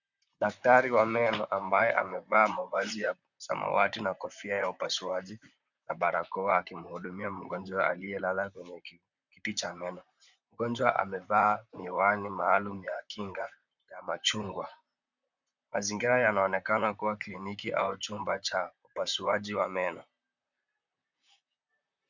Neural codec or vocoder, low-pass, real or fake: vocoder, 44.1 kHz, 128 mel bands, Pupu-Vocoder; 7.2 kHz; fake